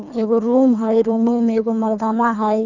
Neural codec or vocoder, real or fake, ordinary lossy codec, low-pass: codec, 24 kHz, 3 kbps, HILCodec; fake; none; 7.2 kHz